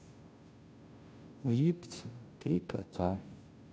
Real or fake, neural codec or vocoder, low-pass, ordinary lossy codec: fake; codec, 16 kHz, 0.5 kbps, FunCodec, trained on Chinese and English, 25 frames a second; none; none